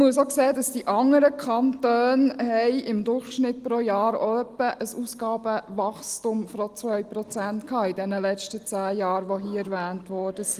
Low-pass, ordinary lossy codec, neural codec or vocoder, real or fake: 14.4 kHz; Opus, 24 kbps; none; real